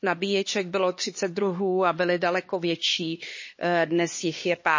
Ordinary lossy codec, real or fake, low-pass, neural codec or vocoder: MP3, 32 kbps; fake; 7.2 kHz; codec, 16 kHz, 2 kbps, X-Codec, HuBERT features, trained on LibriSpeech